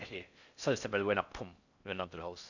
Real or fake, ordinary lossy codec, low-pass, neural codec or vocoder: fake; none; 7.2 kHz; codec, 16 kHz in and 24 kHz out, 0.6 kbps, FocalCodec, streaming, 4096 codes